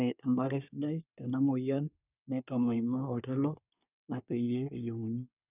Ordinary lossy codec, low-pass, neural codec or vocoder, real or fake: none; 3.6 kHz; codec, 24 kHz, 1 kbps, SNAC; fake